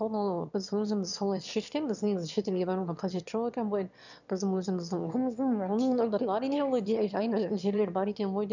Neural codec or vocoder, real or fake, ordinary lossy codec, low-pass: autoencoder, 22.05 kHz, a latent of 192 numbers a frame, VITS, trained on one speaker; fake; none; 7.2 kHz